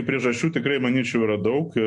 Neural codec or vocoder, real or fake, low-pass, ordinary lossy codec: none; real; 10.8 kHz; MP3, 48 kbps